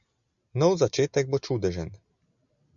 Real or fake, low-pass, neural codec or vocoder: real; 7.2 kHz; none